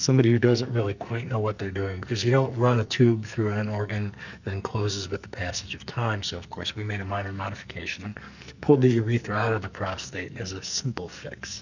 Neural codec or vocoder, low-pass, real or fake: codec, 44.1 kHz, 2.6 kbps, SNAC; 7.2 kHz; fake